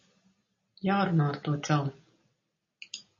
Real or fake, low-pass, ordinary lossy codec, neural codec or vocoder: real; 7.2 kHz; MP3, 32 kbps; none